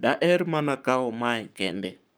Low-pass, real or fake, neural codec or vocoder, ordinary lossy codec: none; fake; codec, 44.1 kHz, 7.8 kbps, Pupu-Codec; none